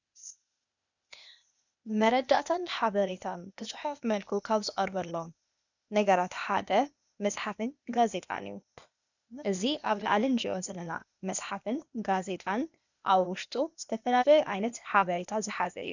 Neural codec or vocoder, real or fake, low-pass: codec, 16 kHz, 0.8 kbps, ZipCodec; fake; 7.2 kHz